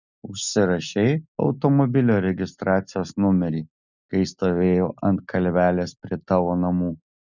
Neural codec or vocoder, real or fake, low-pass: none; real; 7.2 kHz